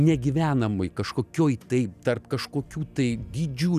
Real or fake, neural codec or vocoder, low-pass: fake; vocoder, 44.1 kHz, 128 mel bands every 256 samples, BigVGAN v2; 14.4 kHz